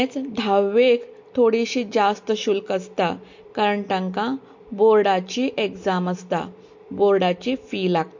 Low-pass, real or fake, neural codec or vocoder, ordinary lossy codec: 7.2 kHz; real; none; MP3, 48 kbps